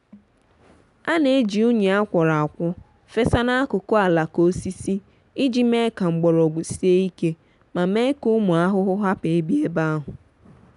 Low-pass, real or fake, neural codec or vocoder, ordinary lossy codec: 10.8 kHz; real; none; none